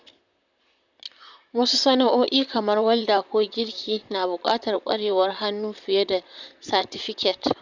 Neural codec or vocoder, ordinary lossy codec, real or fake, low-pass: vocoder, 44.1 kHz, 128 mel bands, Pupu-Vocoder; none; fake; 7.2 kHz